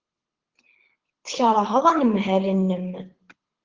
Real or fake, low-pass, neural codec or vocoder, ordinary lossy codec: fake; 7.2 kHz; codec, 24 kHz, 6 kbps, HILCodec; Opus, 16 kbps